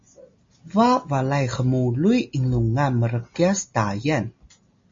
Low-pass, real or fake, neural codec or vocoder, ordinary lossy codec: 7.2 kHz; real; none; MP3, 32 kbps